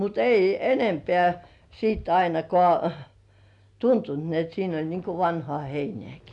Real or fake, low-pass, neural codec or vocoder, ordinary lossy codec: real; 10.8 kHz; none; none